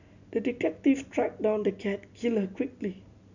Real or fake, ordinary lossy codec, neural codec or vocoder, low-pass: real; none; none; 7.2 kHz